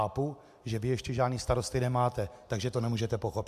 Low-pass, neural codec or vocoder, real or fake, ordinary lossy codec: 14.4 kHz; none; real; AAC, 96 kbps